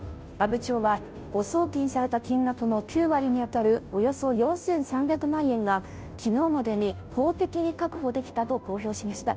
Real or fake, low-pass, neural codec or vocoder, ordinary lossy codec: fake; none; codec, 16 kHz, 0.5 kbps, FunCodec, trained on Chinese and English, 25 frames a second; none